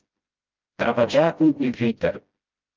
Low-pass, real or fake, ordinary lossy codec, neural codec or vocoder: 7.2 kHz; fake; Opus, 24 kbps; codec, 16 kHz, 0.5 kbps, FreqCodec, smaller model